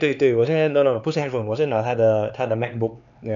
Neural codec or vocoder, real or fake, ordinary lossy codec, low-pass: codec, 16 kHz, 4 kbps, X-Codec, HuBERT features, trained on LibriSpeech; fake; none; 7.2 kHz